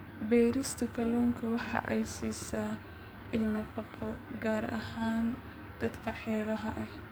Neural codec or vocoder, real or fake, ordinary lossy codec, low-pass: codec, 44.1 kHz, 2.6 kbps, SNAC; fake; none; none